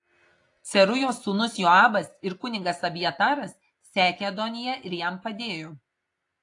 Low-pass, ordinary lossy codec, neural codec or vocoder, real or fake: 10.8 kHz; AAC, 48 kbps; none; real